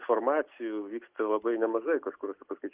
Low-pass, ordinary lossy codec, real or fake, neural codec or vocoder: 3.6 kHz; Opus, 32 kbps; real; none